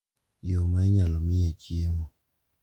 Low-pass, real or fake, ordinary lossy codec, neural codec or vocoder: 19.8 kHz; fake; Opus, 32 kbps; autoencoder, 48 kHz, 128 numbers a frame, DAC-VAE, trained on Japanese speech